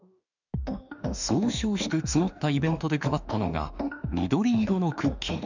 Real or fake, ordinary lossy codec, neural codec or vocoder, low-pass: fake; none; autoencoder, 48 kHz, 32 numbers a frame, DAC-VAE, trained on Japanese speech; 7.2 kHz